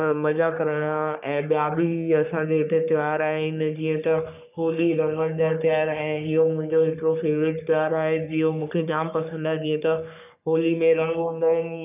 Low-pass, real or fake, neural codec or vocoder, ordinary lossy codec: 3.6 kHz; fake; codec, 44.1 kHz, 3.4 kbps, Pupu-Codec; none